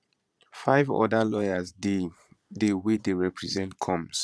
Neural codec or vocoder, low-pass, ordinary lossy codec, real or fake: vocoder, 22.05 kHz, 80 mel bands, Vocos; none; none; fake